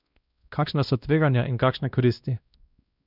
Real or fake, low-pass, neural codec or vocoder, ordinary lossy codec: fake; 5.4 kHz; codec, 16 kHz, 1 kbps, X-Codec, WavLM features, trained on Multilingual LibriSpeech; none